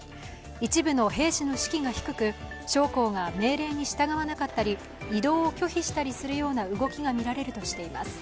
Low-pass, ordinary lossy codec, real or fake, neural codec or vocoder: none; none; real; none